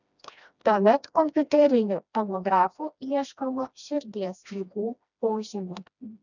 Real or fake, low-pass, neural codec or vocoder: fake; 7.2 kHz; codec, 16 kHz, 1 kbps, FreqCodec, smaller model